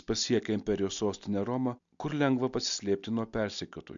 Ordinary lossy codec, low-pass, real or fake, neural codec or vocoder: AAC, 64 kbps; 7.2 kHz; real; none